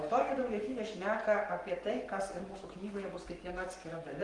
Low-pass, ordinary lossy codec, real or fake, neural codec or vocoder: 10.8 kHz; Opus, 24 kbps; fake; codec, 44.1 kHz, 7.8 kbps, Pupu-Codec